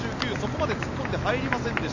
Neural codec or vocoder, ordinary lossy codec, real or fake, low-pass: none; none; real; 7.2 kHz